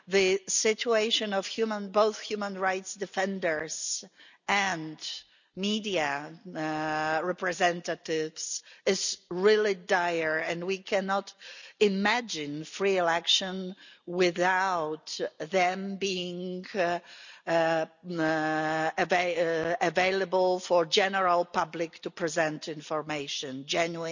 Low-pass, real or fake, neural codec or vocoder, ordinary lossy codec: 7.2 kHz; real; none; none